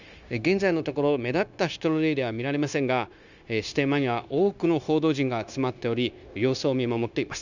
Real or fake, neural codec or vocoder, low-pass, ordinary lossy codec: fake; codec, 16 kHz, 0.9 kbps, LongCat-Audio-Codec; 7.2 kHz; none